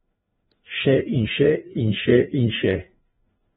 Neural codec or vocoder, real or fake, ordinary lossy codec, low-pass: codec, 16 kHz, 2 kbps, FreqCodec, larger model; fake; AAC, 16 kbps; 7.2 kHz